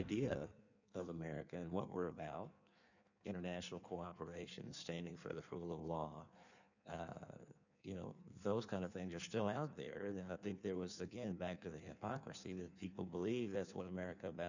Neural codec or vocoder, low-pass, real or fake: codec, 16 kHz in and 24 kHz out, 1.1 kbps, FireRedTTS-2 codec; 7.2 kHz; fake